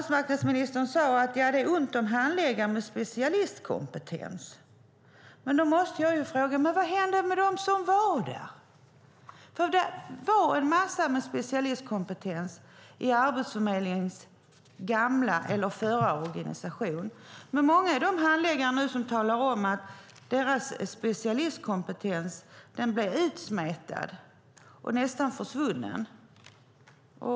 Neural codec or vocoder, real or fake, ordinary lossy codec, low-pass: none; real; none; none